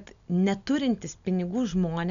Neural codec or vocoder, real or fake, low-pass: none; real; 7.2 kHz